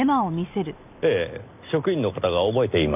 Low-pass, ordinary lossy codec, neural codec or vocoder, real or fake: 3.6 kHz; none; none; real